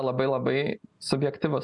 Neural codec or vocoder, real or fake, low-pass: none; real; 10.8 kHz